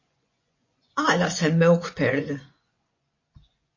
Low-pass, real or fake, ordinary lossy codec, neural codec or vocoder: 7.2 kHz; fake; MP3, 32 kbps; vocoder, 24 kHz, 100 mel bands, Vocos